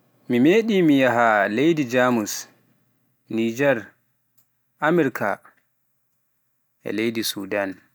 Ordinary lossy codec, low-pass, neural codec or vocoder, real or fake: none; none; none; real